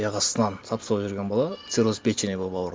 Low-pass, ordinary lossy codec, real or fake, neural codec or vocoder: none; none; real; none